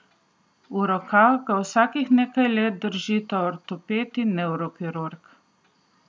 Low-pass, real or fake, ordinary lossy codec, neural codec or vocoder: none; real; none; none